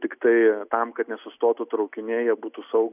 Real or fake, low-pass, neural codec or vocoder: real; 3.6 kHz; none